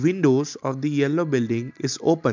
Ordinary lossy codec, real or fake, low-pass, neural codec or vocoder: MP3, 64 kbps; real; 7.2 kHz; none